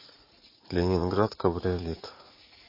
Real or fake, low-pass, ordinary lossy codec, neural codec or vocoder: fake; 5.4 kHz; MP3, 24 kbps; vocoder, 44.1 kHz, 80 mel bands, Vocos